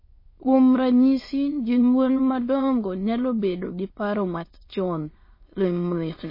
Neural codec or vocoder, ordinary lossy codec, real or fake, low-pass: autoencoder, 22.05 kHz, a latent of 192 numbers a frame, VITS, trained on many speakers; MP3, 24 kbps; fake; 5.4 kHz